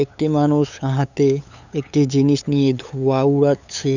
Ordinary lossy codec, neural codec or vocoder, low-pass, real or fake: none; codec, 16 kHz, 8 kbps, FunCodec, trained on LibriTTS, 25 frames a second; 7.2 kHz; fake